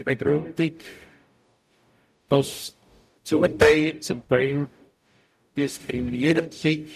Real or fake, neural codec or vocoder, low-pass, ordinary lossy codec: fake; codec, 44.1 kHz, 0.9 kbps, DAC; 14.4 kHz; none